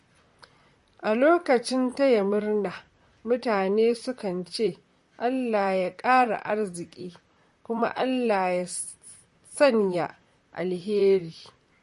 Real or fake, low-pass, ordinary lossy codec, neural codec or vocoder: fake; 14.4 kHz; MP3, 48 kbps; vocoder, 44.1 kHz, 128 mel bands, Pupu-Vocoder